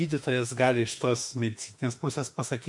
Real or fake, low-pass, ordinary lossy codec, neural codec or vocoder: fake; 10.8 kHz; MP3, 64 kbps; autoencoder, 48 kHz, 32 numbers a frame, DAC-VAE, trained on Japanese speech